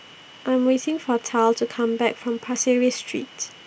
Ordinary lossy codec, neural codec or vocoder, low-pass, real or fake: none; none; none; real